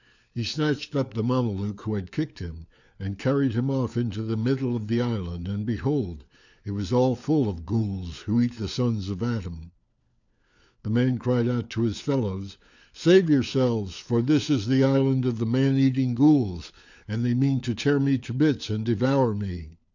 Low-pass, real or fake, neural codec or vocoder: 7.2 kHz; fake; codec, 16 kHz, 4 kbps, FunCodec, trained on LibriTTS, 50 frames a second